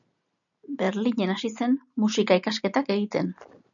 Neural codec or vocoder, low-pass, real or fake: none; 7.2 kHz; real